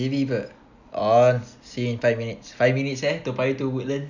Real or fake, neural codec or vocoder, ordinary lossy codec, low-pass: real; none; none; 7.2 kHz